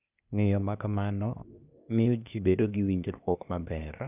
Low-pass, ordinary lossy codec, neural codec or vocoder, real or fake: 3.6 kHz; none; codec, 16 kHz, 0.8 kbps, ZipCodec; fake